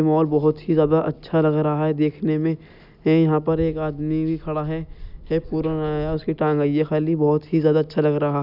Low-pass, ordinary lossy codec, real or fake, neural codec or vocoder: 5.4 kHz; none; real; none